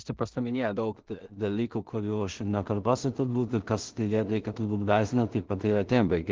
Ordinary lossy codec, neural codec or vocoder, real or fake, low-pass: Opus, 16 kbps; codec, 16 kHz in and 24 kHz out, 0.4 kbps, LongCat-Audio-Codec, two codebook decoder; fake; 7.2 kHz